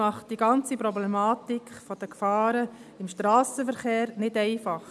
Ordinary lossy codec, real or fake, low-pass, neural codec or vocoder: none; real; none; none